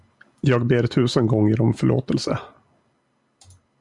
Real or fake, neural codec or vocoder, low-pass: real; none; 10.8 kHz